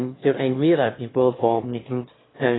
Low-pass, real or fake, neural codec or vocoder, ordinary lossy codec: 7.2 kHz; fake; autoencoder, 22.05 kHz, a latent of 192 numbers a frame, VITS, trained on one speaker; AAC, 16 kbps